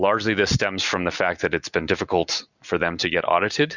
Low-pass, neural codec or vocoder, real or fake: 7.2 kHz; none; real